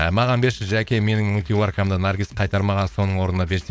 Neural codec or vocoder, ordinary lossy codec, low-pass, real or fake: codec, 16 kHz, 4.8 kbps, FACodec; none; none; fake